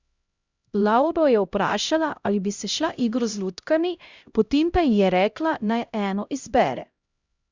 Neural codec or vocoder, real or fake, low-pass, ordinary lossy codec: codec, 16 kHz, 0.5 kbps, X-Codec, HuBERT features, trained on LibriSpeech; fake; 7.2 kHz; Opus, 64 kbps